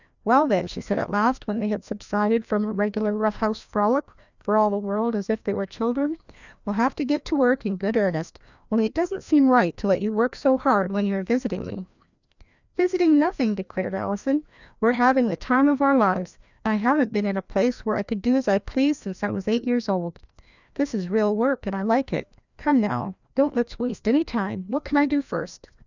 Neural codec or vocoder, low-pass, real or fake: codec, 16 kHz, 1 kbps, FreqCodec, larger model; 7.2 kHz; fake